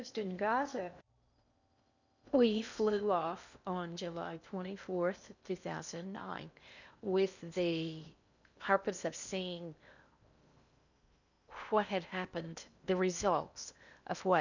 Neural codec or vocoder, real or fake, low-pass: codec, 16 kHz in and 24 kHz out, 0.8 kbps, FocalCodec, streaming, 65536 codes; fake; 7.2 kHz